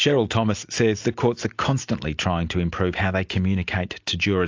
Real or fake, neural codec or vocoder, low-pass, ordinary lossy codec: real; none; 7.2 kHz; AAC, 48 kbps